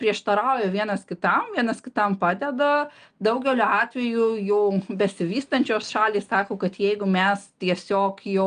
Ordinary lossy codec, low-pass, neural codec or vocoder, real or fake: Opus, 32 kbps; 9.9 kHz; none; real